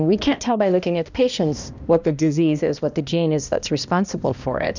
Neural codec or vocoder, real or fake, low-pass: codec, 16 kHz, 1 kbps, X-Codec, HuBERT features, trained on balanced general audio; fake; 7.2 kHz